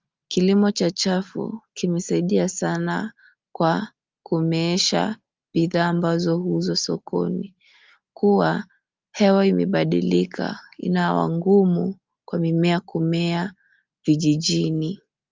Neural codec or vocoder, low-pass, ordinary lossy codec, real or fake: none; 7.2 kHz; Opus, 24 kbps; real